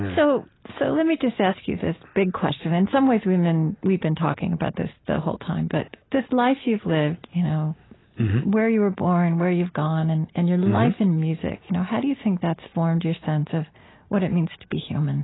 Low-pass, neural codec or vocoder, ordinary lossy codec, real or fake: 7.2 kHz; none; AAC, 16 kbps; real